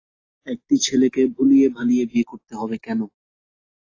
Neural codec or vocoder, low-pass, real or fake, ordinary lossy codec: none; 7.2 kHz; real; AAC, 32 kbps